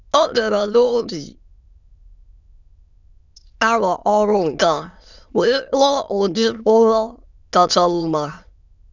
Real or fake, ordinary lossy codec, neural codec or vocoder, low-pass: fake; none; autoencoder, 22.05 kHz, a latent of 192 numbers a frame, VITS, trained on many speakers; 7.2 kHz